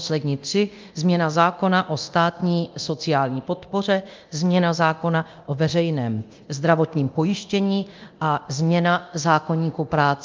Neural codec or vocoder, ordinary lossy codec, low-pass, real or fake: codec, 24 kHz, 0.9 kbps, DualCodec; Opus, 24 kbps; 7.2 kHz; fake